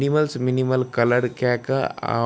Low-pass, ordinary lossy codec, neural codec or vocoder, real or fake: none; none; none; real